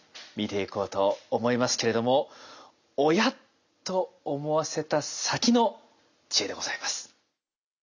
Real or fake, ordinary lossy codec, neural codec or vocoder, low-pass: real; none; none; 7.2 kHz